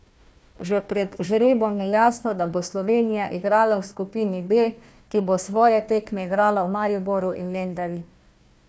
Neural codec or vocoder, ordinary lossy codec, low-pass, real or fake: codec, 16 kHz, 1 kbps, FunCodec, trained on Chinese and English, 50 frames a second; none; none; fake